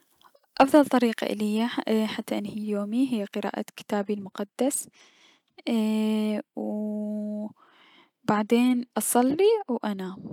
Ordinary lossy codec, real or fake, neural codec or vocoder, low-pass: none; real; none; 19.8 kHz